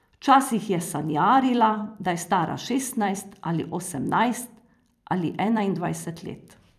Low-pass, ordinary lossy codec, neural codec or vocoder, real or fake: 14.4 kHz; none; vocoder, 44.1 kHz, 128 mel bands every 256 samples, BigVGAN v2; fake